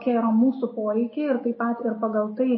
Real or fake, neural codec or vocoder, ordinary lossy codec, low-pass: real; none; MP3, 24 kbps; 7.2 kHz